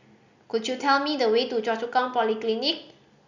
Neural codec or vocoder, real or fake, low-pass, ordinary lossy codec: none; real; 7.2 kHz; none